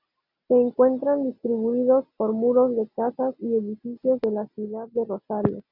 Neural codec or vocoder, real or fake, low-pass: none; real; 5.4 kHz